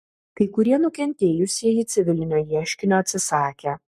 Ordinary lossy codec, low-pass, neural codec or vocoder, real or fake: MP3, 64 kbps; 14.4 kHz; codec, 44.1 kHz, 7.8 kbps, Pupu-Codec; fake